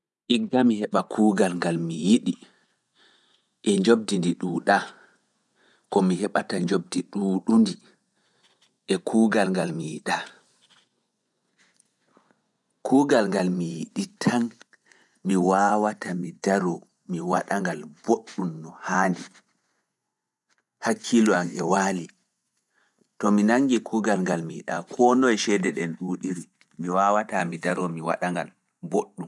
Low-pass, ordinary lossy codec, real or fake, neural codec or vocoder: none; none; fake; vocoder, 24 kHz, 100 mel bands, Vocos